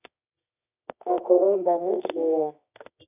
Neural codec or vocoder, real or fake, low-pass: codec, 24 kHz, 0.9 kbps, WavTokenizer, medium music audio release; fake; 3.6 kHz